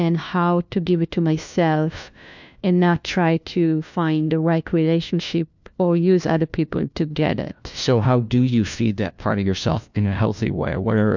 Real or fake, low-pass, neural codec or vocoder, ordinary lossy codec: fake; 7.2 kHz; codec, 16 kHz, 1 kbps, FunCodec, trained on LibriTTS, 50 frames a second; MP3, 64 kbps